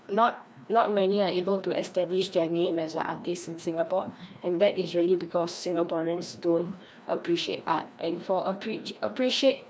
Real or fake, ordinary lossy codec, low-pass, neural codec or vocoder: fake; none; none; codec, 16 kHz, 1 kbps, FreqCodec, larger model